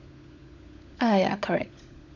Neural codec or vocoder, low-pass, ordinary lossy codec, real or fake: codec, 16 kHz, 16 kbps, FunCodec, trained on LibriTTS, 50 frames a second; 7.2 kHz; none; fake